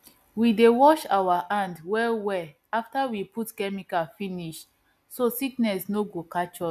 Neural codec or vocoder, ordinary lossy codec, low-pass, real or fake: none; AAC, 96 kbps; 14.4 kHz; real